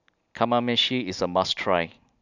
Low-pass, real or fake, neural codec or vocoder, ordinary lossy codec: 7.2 kHz; real; none; none